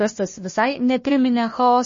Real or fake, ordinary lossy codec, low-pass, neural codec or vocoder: fake; MP3, 32 kbps; 7.2 kHz; codec, 16 kHz, 1 kbps, FunCodec, trained on Chinese and English, 50 frames a second